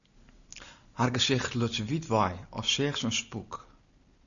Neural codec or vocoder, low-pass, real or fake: none; 7.2 kHz; real